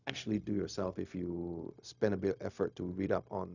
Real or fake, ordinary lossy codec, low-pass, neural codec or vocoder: fake; none; 7.2 kHz; codec, 16 kHz, 0.4 kbps, LongCat-Audio-Codec